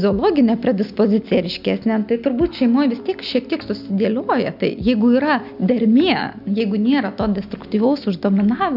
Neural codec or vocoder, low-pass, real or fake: none; 5.4 kHz; real